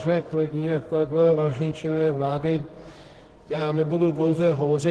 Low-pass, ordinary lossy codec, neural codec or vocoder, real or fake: 10.8 kHz; Opus, 16 kbps; codec, 24 kHz, 0.9 kbps, WavTokenizer, medium music audio release; fake